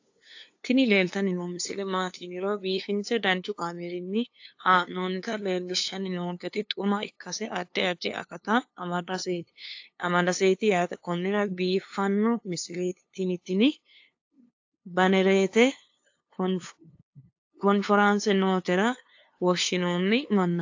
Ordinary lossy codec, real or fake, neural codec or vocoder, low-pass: AAC, 48 kbps; fake; codec, 16 kHz, 2 kbps, FunCodec, trained on LibriTTS, 25 frames a second; 7.2 kHz